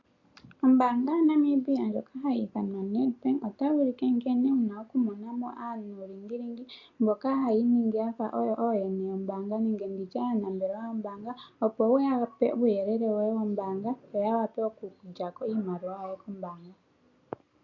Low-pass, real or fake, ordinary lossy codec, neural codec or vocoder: 7.2 kHz; real; AAC, 48 kbps; none